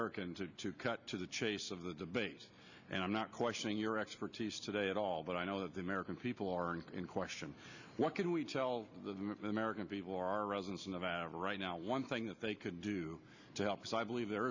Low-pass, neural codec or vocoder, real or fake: 7.2 kHz; none; real